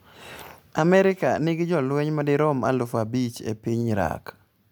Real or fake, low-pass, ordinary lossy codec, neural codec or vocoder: real; none; none; none